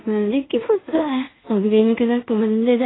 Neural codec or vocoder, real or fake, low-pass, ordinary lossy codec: codec, 16 kHz in and 24 kHz out, 0.4 kbps, LongCat-Audio-Codec, two codebook decoder; fake; 7.2 kHz; AAC, 16 kbps